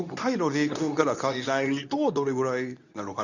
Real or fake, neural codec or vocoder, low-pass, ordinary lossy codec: fake; codec, 24 kHz, 0.9 kbps, WavTokenizer, medium speech release version 2; 7.2 kHz; none